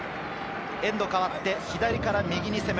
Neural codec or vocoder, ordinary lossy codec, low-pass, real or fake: none; none; none; real